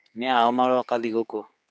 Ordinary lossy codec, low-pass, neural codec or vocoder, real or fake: none; none; codec, 16 kHz, 4 kbps, X-Codec, HuBERT features, trained on general audio; fake